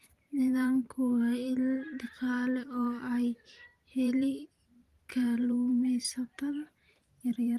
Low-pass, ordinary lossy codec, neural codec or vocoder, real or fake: 14.4 kHz; Opus, 24 kbps; vocoder, 44.1 kHz, 128 mel bands every 512 samples, BigVGAN v2; fake